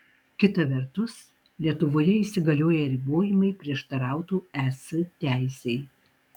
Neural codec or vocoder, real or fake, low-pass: codec, 44.1 kHz, 7.8 kbps, DAC; fake; 19.8 kHz